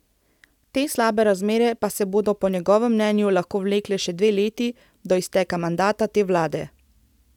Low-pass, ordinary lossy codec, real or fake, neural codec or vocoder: 19.8 kHz; none; real; none